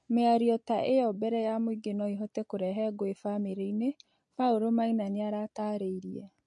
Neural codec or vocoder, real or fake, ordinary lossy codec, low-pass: none; real; MP3, 48 kbps; 10.8 kHz